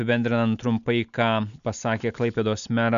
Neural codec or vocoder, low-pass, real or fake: none; 7.2 kHz; real